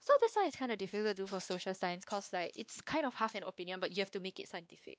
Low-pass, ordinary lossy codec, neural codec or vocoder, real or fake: none; none; codec, 16 kHz, 2 kbps, X-Codec, WavLM features, trained on Multilingual LibriSpeech; fake